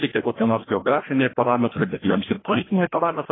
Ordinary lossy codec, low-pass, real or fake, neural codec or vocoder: AAC, 16 kbps; 7.2 kHz; fake; codec, 16 kHz, 1 kbps, FreqCodec, larger model